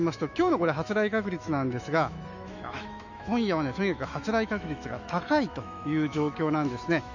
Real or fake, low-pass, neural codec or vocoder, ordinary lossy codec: fake; 7.2 kHz; autoencoder, 48 kHz, 128 numbers a frame, DAC-VAE, trained on Japanese speech; none